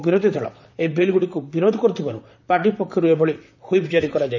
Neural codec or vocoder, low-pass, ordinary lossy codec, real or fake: vocoder, 44.1 kHz, 128 mel bands, Pupu-Vocoder; 7.2 kHz; none; fake